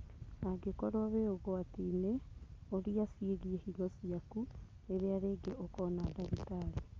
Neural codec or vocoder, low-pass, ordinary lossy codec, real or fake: none; 7.2 kHz; Opus, 24 kbps; real